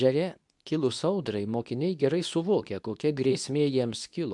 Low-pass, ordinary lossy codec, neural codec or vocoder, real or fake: 10.8 kHz; MP3, 96 kbps; codec, 24 kHz, 0.9 kbps, WavTokenizer, medium speech release version 2; fake